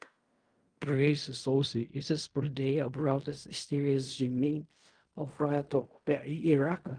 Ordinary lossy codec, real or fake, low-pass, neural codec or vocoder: Opus, 32 kbps; fake; 9.9 kHz; codec, 16 kHz in and 24 kHz out, 0.4 kbps, LongCat-Audio-Codec, fine tuned four codebook decoder